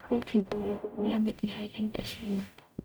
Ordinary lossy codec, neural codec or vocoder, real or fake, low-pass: none; codec, 44.1 kHz, 0.9 kbps, DAC; fake; none